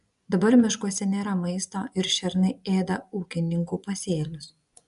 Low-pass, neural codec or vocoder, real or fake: 10.8 kHz; vocoder, 24 kHz, 100 mel bands, Vocos; fake